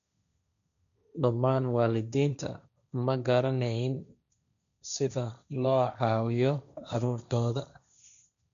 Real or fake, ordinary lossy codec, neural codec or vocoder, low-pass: fake; none; codec, 16 kHz, 1.1 kbps, Voila-Tokenizer; 7.2 kHz